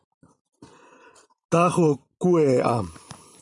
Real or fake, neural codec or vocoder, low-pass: fake; vocoder, 44.1 kHz, 128 mel bands every 512 samples, BigVGAN v2; 10.8 kHz